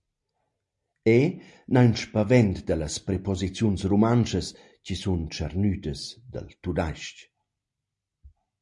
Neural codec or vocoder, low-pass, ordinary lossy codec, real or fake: none; 10.8 kHz; MP3, 48 kbps; real